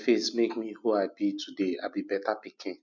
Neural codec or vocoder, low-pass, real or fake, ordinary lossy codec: none; 7.2 kHz; real; none